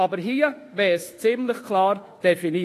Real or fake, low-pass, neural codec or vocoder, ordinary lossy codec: fake; 14.4 kHz; autoencoder, 48 kHz, 32 numbers a frame, DAC-VAE, trained on Japanese speech; AAC, 48 kbps